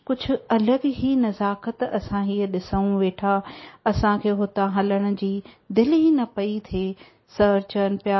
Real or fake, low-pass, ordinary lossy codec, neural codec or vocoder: real; 7.2 kHz; MP3, 24 kbps; none